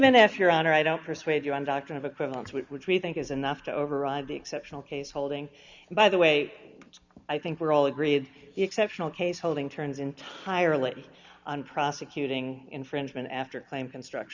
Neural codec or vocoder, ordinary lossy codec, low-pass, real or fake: none; Opus, 64 kbps; 7.2 kHz; real